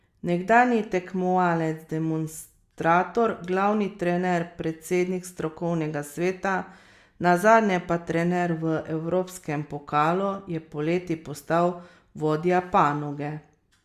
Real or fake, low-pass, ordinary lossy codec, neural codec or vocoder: real; 14.4 kHz; Opus, 64 kbps; none